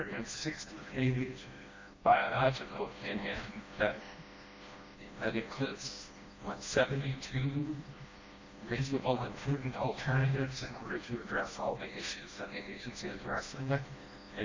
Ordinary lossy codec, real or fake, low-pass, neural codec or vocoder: MP3, 48 kbps; fake; 7.2 kHz; codec, 16 kHz, 1 kbps, FreqCodec, smaller model